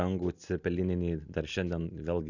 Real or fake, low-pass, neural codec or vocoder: real; 7.2 kHz; none